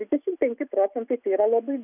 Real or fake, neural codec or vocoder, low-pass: real; none; 3.6 kHz